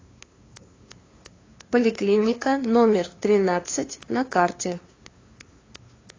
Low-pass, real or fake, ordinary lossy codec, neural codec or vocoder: 7.2 kHz; fake; AAC, 32 kbps; codec, 16 kHz, 2 kbps, FunCodec, trained on LibriTTS, 25 frames a second